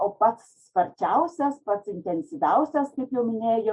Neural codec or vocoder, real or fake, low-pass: none; real; 10.8 kHz